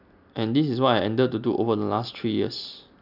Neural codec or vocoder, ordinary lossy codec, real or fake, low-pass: none; none; real; 5.4 kHz